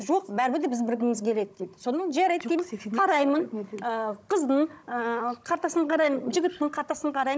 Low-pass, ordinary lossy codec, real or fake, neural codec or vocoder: none; none; fake; codec, 16 kHz, 16 kbps, FunCodec, trained on Chinese and English, 50 frames a second